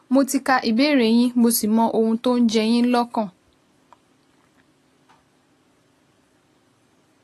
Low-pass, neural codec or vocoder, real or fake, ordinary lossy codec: 14.4 kHz; none; real; AAC, 48 kbps